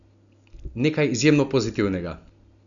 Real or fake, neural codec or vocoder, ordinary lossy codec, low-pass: real; none; none; 7.2 kHz